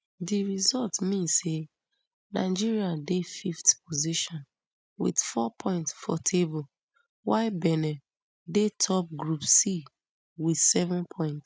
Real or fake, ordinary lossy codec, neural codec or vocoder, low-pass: real; none; none; none